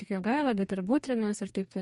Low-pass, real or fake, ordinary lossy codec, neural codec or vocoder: 19.8 kHz; fake; MP3, 48 kbps; codec, 44.1 kHz, 2.6 kbps, DAC